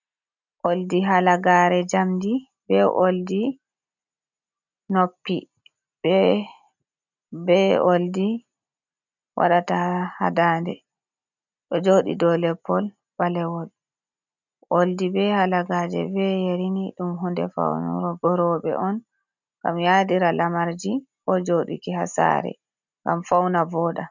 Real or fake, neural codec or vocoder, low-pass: real; none; 7.2 kHz